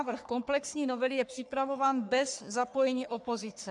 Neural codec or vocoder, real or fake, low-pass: codec, 44.1 kHz, 3.4 kbps, Pupu-Codec; fake; 10.8 kHz